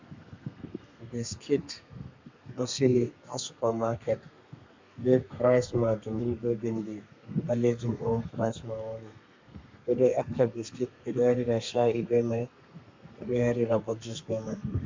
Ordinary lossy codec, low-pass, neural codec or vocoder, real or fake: MP3, 64 kbps; 7.2 kHz; codec, 32 kHz, 1.9 kbps, SNAC; fake